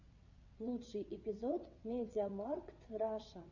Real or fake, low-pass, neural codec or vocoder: fake; 7.2 kHz; vocoder, 22.05 kHz, 80 mel bands, WaveNeXt